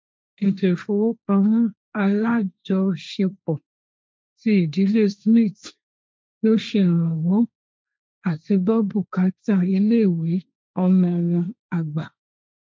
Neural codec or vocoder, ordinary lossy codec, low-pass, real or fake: codec, 16 kHz, 1.1 kbps, Voila-Tokenizer; none; none; fake